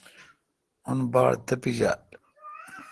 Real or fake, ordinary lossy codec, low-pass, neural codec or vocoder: real; Opus, 16 kbps; 10.8 kHz; none